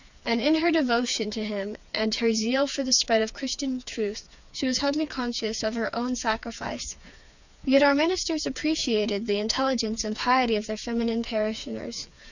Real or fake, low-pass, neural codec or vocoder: fake; 7.2 kHz; codec, 16 kHz, 4 kbps, FreqCodec, smaller model